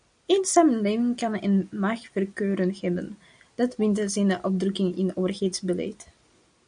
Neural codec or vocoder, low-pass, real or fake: none; 9.9 kHz; real